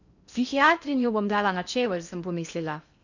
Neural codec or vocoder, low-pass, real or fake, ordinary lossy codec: codec, 16 kHz in and 24 kHz out, 0.6 kbps, FocalCodec, streaming, 2048 codes; 7.2 kHz; fake; none